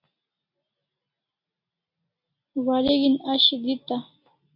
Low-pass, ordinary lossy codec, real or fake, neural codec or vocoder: 5.4 kHz; MP3, 32 kbps; real; none